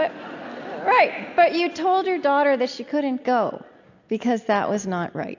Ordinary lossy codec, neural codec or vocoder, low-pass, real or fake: AAC, 48 kbps; none; 7.2 kHz; real